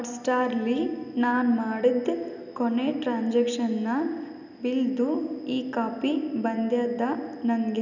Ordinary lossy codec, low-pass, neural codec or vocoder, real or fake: none; 7.2 kHz; none; real